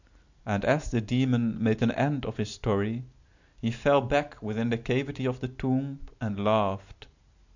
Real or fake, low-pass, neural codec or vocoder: real; 7.2 kHz; none